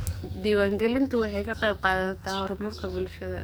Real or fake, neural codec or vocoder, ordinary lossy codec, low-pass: fake; codec, 44.1 kHz, 2.6 kbps, SNAC; none; none